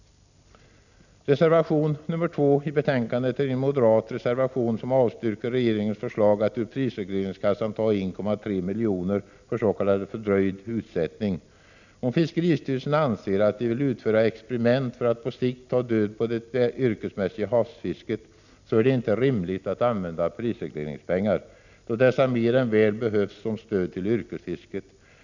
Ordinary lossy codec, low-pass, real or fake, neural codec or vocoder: none; 7.2 kHz; real; none